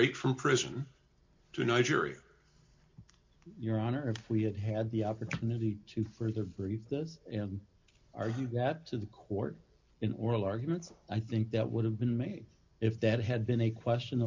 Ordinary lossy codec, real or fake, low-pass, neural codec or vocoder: MP3, 64 kbps; real; 7.2 kHz; none